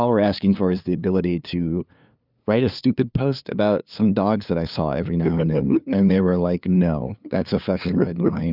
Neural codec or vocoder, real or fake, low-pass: codec, 16 kHz, 2 kbps, FunCodec, trained on LibriTTS, 25 frames a second; fake; 5.4 kHz